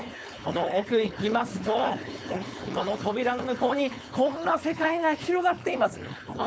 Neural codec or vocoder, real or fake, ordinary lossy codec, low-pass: codec, 16 kHz, 4.8 kbps, FACodec; fake; none; none